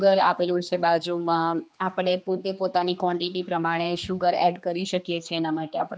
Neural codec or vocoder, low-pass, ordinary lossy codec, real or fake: codec, 16 kHz, 2 kbps, X-Codec, HuBERT features, trained on general audio; none; none; fake